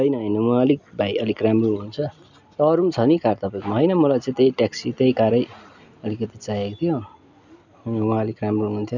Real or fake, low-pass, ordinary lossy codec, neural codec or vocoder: real; 7.2 kHz; none; none